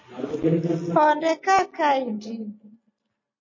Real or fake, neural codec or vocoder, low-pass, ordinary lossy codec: real; none; 7.2 kHz; MP3, 32 kbps